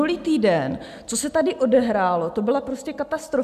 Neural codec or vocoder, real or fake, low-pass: none; real; 14.4 kHz